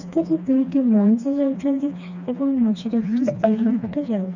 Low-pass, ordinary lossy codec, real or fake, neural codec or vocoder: 7.2 kHz; none; fake; codec, 16 kHz, 2 kbps, FreqCodec, smaller model